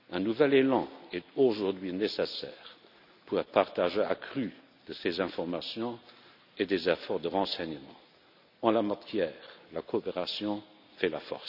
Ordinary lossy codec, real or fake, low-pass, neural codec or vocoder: none; real; 5.4 kHz; none